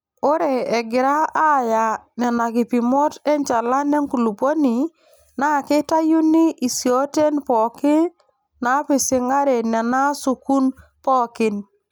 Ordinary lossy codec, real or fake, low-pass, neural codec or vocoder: none; real; none; none